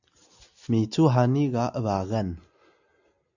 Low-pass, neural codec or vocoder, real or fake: 7.2 kHz; none; real